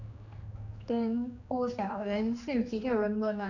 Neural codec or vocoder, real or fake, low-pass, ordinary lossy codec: codec, 16 kHz, 2 kbps, X-Codec, HuBERT features, trained on general audio; fake; 7.2 kHz; none